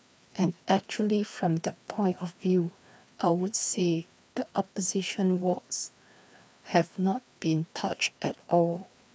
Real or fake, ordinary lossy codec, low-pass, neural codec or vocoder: fake; none; none; codec, 16 kHz, 2 kbps, FreqCodec, larger model